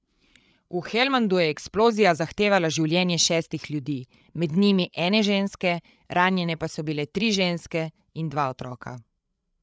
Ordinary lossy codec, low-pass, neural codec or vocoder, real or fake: none; none; codec, 16 kHz, 8 kbps, FreqCodec, larger model; fake